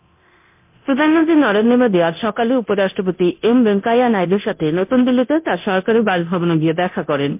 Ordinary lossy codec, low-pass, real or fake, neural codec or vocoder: MP3, 32 kbps; 3.6 kHz; fake; codec, 24 kHz, 0.9 kbps, DualCodec